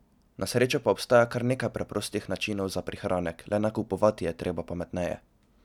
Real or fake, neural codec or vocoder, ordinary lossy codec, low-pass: real; none; none; 19.8 kHz